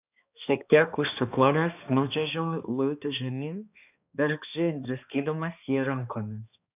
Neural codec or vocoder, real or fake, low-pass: codec, 16 kHz, 2 kbps, X-Codec, HuBERT features, trained on balanced general audio; fake; 3.6 kHz